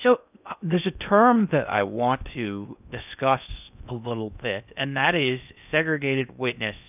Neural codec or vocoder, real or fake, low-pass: codec, 16 kHz in and 24 kHz out, 0.6 kbps, FocalCodec, streaming, 4096 codes; fake; 3.6 kHz